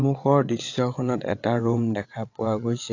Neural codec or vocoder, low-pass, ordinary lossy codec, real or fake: vocoder, 22.05 kHz, 80 mel bands, WaveNeXt; 7.2 kHz; AAC, 48 kbps; fake